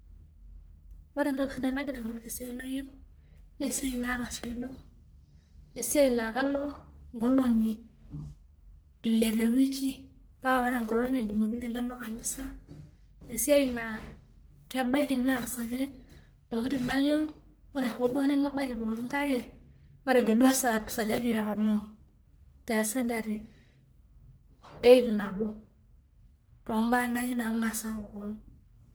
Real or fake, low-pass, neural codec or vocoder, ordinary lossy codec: fake; none; codec, 44.1 kHz, 1.7 kbps, Pupu-Codec; none